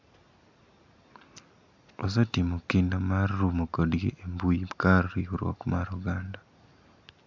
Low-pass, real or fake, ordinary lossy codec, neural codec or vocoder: 7.2 kHz; real; MP3, 64 kbps; none